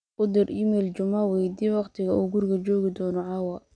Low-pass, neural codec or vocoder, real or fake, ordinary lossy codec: 9.9 kHz; none; real; none